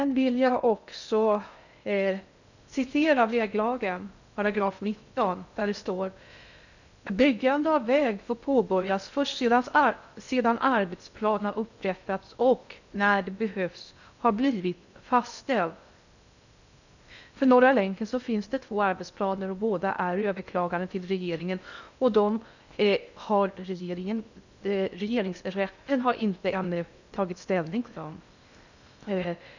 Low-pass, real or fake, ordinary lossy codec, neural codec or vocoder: 7.2 kHz; fake; none; codec, 16 kHz in and 24 kHz out, 0.6 kbps, FocalCodec, streaming, 2048 codes